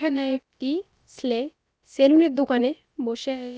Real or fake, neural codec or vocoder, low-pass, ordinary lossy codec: fake; codec, 16 kHz, about 1 kbps, DyCAST, with the encoder's durations; none; none